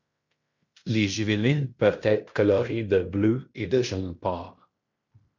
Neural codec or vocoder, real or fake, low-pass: codec, 16 kHz in and 24 kHz out, 0.9 kbps, LongCat-Audio-Codec, fine tuned four codebook decoder; fake; 7.2 kHz